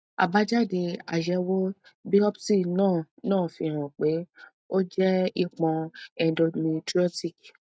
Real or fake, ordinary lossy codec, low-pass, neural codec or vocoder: real; none; none; none